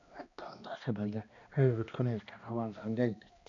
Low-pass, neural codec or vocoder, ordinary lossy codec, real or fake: 7.2 kHz; codec, 16 kHz, 1 kbps, X-Codec, HuBERT features, trained on balanced general audio; none; fake